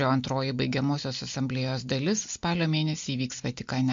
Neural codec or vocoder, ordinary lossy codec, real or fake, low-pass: none; MP3, 48 kbps; real; 7.2 kHz